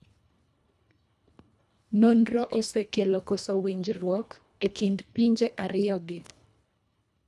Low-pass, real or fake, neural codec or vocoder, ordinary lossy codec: none; fake; codec, 24 kHz, 1.5 kbps, HILCodec; none